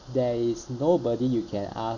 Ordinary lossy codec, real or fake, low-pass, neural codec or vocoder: none; real; 7.2 kHz; none